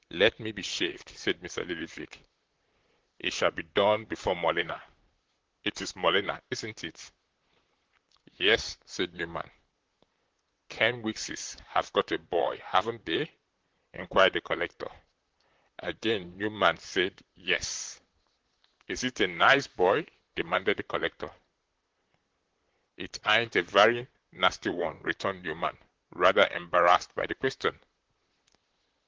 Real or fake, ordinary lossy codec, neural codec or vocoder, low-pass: fake; Opus, 16 kbps; vocoder, 44.1 kHz, 128 mel bands, Pupu-Vocoder; 7.2 kHz